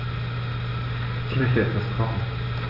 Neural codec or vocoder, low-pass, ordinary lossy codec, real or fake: none; 5.4 kHz; none; real